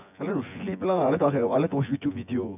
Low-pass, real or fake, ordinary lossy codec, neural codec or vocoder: 3.6 kHz; fake; none; vocoder, 24 kHz, 100 mel bands, Vocos